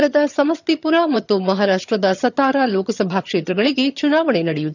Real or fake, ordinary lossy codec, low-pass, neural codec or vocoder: fake; none; 7.2 kHz; vocoder, 22.05 kHz, 80 mel bands, HiFi-GAN